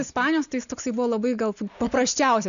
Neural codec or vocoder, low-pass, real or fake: none; 7.2 kHz; real